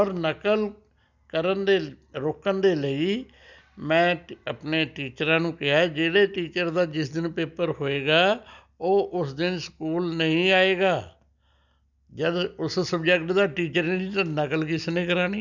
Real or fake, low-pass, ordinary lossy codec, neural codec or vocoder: real; 7.2 kHz; none; none